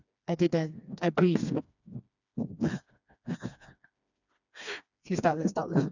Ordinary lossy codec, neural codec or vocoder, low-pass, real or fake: none; codec, 16 kHz, 2 kbps, FreqCodec, smaller model; 7.2 kHz; fake